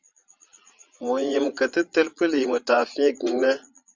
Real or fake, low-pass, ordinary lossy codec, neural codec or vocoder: fake; 7.2 kHz; Opus, 32 kbps; vocoder, 22.05 kHz, 80 mel bands, Vocos